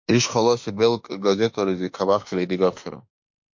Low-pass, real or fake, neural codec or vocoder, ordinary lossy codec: 7.2 kHz; fake; autoencoder, 48 kHz, 32 numbers a frame, DAC-VAE, trained on Japanese speech; MP3, 48 kbps